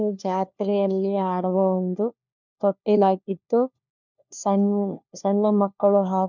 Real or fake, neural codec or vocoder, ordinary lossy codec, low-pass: fake; codec, 16 kHz, 1 kbps, FunCodec, trained on LibriTTS, 50 frames a second; none; 7.2 kHz